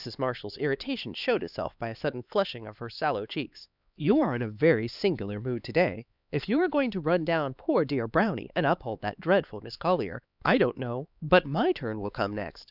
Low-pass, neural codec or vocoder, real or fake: 5.4 kHz; codec, 16 kHz, 4 kbps, X-Codec, HuBERT features, trained on LibriSpeech; fake